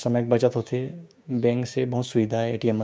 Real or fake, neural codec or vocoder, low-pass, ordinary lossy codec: fake; codec, 16 kHz, 6 kbps, DAC; none; none